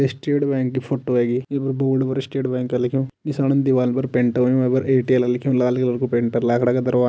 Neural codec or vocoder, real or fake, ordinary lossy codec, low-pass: none; real; none; none